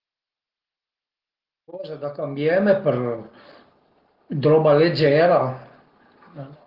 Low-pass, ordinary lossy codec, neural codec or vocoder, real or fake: 5.4 kHz; Opus, 16 kbps; none; real